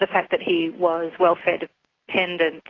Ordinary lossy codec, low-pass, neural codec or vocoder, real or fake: AAC, 32 kbps; 7.2 kHz; none; real